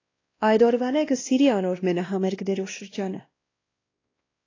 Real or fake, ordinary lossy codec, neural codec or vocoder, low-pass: fake; AAC, 32 kbps; codec, 16 kHz, 1 kbps, X-Codec, WavLM features, trained on Multilingual LibriSpeech; 7.2 kHz